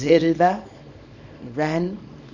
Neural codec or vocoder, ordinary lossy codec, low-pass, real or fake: codec, 24 kHz, 0.9 kbps, WavTokenizer, small release; none; 7.2 kHz; fake